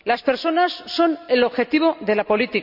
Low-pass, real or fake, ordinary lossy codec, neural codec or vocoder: 5.4 kHz; real; none; none